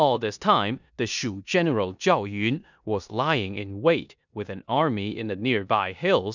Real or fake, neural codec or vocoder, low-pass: fake; codec, 16 kHz in and 24 kHz out, 0.9 kbps, LongCat-Audio-Codec, four codebook decoder; 7.2 kHz